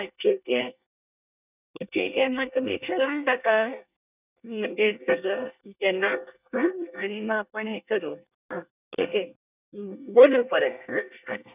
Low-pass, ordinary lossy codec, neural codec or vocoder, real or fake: 3.6 kHz; none; codec, 24 kHz, 1 kbps, SNAC; fake